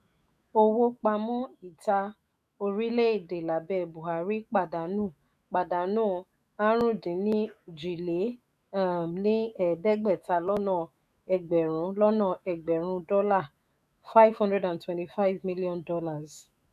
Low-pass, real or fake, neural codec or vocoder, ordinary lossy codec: 14.4 kHz; fake; autoencoder, 48 kHz, 128 numbers a frame, DAC-VAE, trained on Japanese speech; none